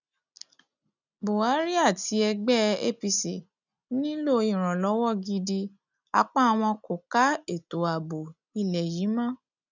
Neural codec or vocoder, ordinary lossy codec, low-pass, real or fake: none; none; 7.2 kHz; real